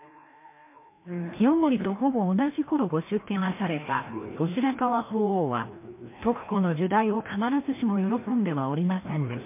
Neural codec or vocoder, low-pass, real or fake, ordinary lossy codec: codec, 16 kHz, 1 kbps, FreqCodec, larger model; 3.6 kHz; fake; MP3, 24 kbps